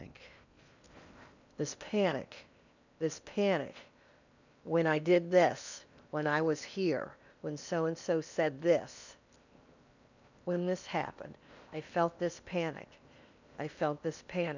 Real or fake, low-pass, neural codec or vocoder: fake; 7.2 kHz; codec, 16 kHz in and 24 kHz out, 0.8 kbps, FocalCodec, streaming, 65536 codes